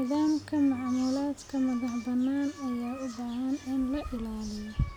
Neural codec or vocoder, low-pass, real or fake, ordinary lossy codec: none; 19.8 kHz; real; none